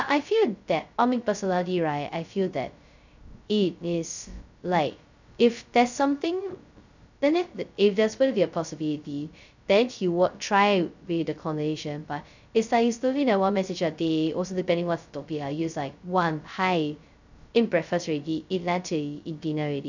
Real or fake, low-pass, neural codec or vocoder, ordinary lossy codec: fake; 7.2 kHz; codec, 16 kHz, 0.2 kbps, FocalCodec; none